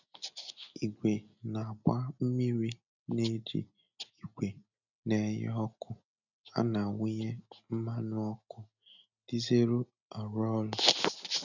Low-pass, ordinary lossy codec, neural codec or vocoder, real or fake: 7.2 kHz; none; none; real